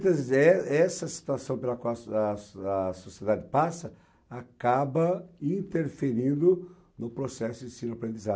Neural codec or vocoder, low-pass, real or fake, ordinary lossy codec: none; none; real; none